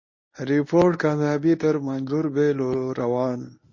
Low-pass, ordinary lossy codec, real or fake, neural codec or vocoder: 7.2 kHz; MP3, 32 kbps; fake; codec, 24 kHz, 0.9 kbps, WavTokenizer, medium speech release version 1